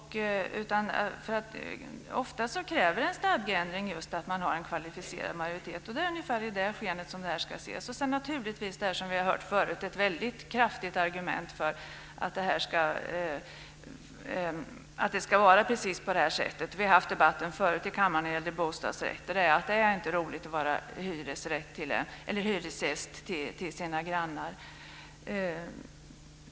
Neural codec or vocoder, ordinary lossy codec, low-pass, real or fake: none; none; none; real